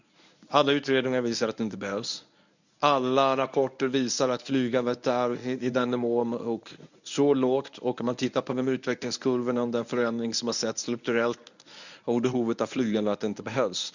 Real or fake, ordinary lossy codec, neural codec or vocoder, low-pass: fake; none; codec, 24 kHz, 0.9 kbps, WavTokenizer, medium speech release version 1; 7.2 kHz